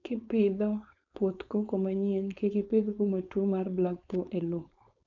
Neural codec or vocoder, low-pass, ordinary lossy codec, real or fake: codec, 16 kHz, 4.8 kbps, FACodec; 7.2 kHz; none; fake